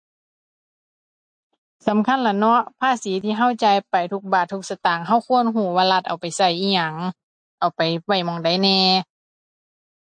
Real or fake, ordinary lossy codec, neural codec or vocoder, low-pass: real; MP3, 48 kbps; none; 9.9 kHz